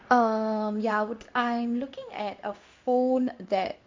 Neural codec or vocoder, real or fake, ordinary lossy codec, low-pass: codec, 16 kHz in and 24 kHz out, 1 kbps, XY-Tokenizer; fake; MP3, 48 kbps; 7.2 kHz